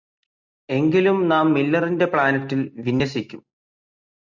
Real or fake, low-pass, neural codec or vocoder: real; 7.2 kHz; none